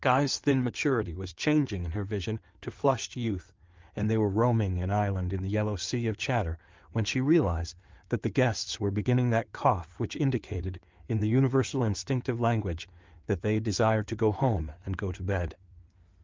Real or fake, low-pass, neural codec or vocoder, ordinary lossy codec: fake; 7.2 kHz; codec, 16 kHz in and 24 kHz out, 2.2 kbps, FireRedTTS-2 codec; Opus, 32 kbps